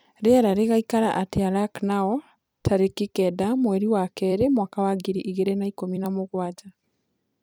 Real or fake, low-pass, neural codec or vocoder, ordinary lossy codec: fake; none; vocoder, 44.1 kHz, 128 mel bands every 256 samples, BigVGAN v2; none